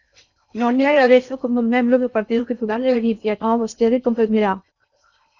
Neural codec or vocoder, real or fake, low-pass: codec, 16 kHz in and 24 kHz out, 0.6 kbps, FocalCodec, streaming, 4096 codes; fake; 7.2 kHz